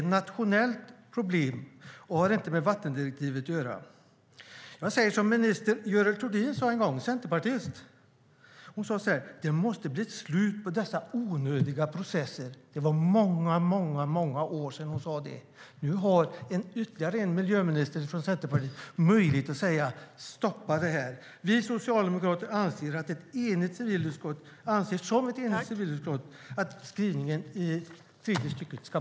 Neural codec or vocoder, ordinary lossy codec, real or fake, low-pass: none; none; real; none